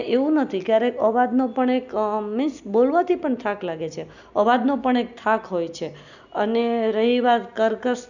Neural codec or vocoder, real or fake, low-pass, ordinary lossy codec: none; real; 7.2 kHz; none